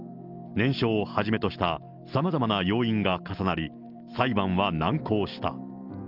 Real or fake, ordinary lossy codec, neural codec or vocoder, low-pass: real; Opus, 32 kbps; none; 5.4 kHz